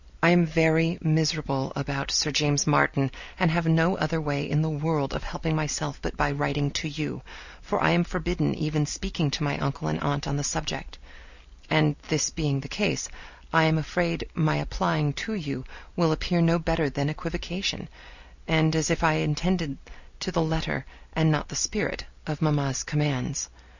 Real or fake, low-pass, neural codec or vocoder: real; 7.2 kHz; none